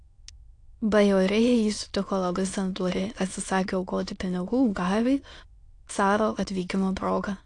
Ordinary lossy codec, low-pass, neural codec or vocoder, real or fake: AAC, 48 kbps; 9.9 kHz; autoencoder, 22.05 kHz, a latent of 192 numbers a frame, VITS, trained on many speakers; fake